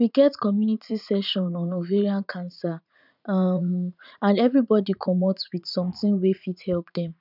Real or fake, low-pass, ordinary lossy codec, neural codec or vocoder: fake; 5.4 kHz; none; vocoder, 44.1 kHz, 80 mel bands, Vocos